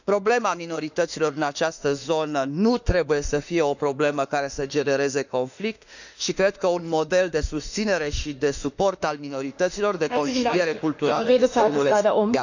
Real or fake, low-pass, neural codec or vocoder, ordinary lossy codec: fake; 7.2 kHz; autoencoder, 48 kHz, 32 numbers a frame, DAC-VAE, trained on Japanese speech; none